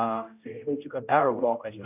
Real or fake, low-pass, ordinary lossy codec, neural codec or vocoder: fake; 3.6 kHz; none; codec, 16 kHz, 0.5 kbps, X-Codec, HuBERT features, trained on general audio